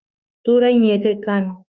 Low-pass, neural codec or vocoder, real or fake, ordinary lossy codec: 7.2 kHz; autoencoder, 48 kHz, 32 numbers a frame, DAC-VAE, trained on Japanese speech; fake; Opus, 64 kbps